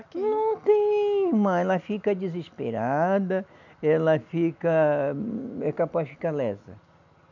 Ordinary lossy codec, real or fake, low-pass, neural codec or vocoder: none; real; 7.2 kHz; none